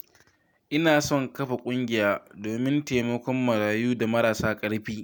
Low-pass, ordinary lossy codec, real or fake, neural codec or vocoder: none; none; real; none